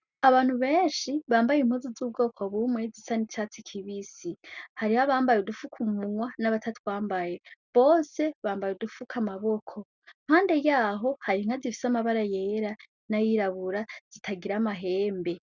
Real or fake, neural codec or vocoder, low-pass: real; none; 7.2 kHz